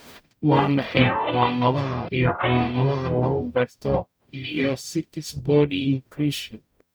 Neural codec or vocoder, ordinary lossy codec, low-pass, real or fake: codec, 44.1 kHz, 0.9 kbps, DAC; none; none; fake